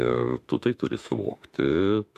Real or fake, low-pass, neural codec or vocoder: fake; 14.4 kHz; autoencoder, 48 kHz, 32 numbers a frame, DAC-VAE, trained on Japanese speech